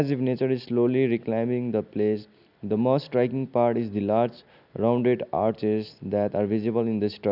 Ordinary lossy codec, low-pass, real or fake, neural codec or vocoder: none; 5.4 kHz; real; none